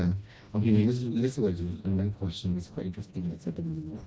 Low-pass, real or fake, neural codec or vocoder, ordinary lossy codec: none; fake; codec, 16 kHz, 1 kbps, FreqCodec, smaller model; none